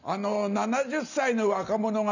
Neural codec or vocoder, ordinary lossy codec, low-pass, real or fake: none; none; 7.2 kHz; real